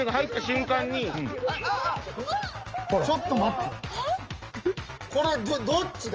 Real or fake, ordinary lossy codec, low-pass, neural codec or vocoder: real; Opus, 24 kbps; 7.2 kHz; none